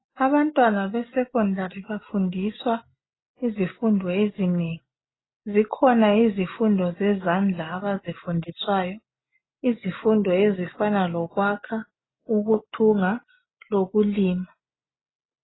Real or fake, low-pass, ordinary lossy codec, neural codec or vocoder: real; 7.2 kHz; AAC, 16 kbps; none